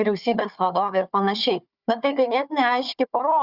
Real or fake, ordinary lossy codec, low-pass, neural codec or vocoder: fake; Opus, 64 kbps; 5.4 kHz; codec, 16 kHz, 4 kbps, FunCodec, trained on Chinese and English, 50 frames a second